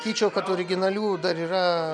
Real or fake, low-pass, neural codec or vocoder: real; 9.9 kHz; none